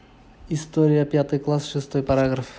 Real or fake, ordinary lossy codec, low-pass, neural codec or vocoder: real; none; none; none